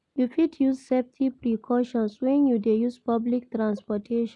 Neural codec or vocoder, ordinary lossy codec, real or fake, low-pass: none; none; real; 10.8 kHz